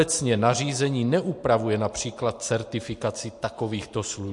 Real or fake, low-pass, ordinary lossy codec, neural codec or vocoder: real; 10.8 kHz; MP3, 48 kbps; none